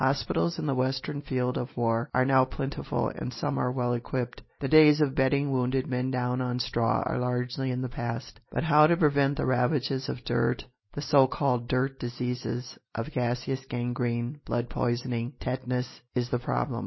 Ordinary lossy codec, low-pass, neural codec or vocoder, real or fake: MP3, 24 kbps; 7.2 kHz; none; real